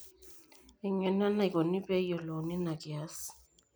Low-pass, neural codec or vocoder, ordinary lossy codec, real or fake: none; none; none; real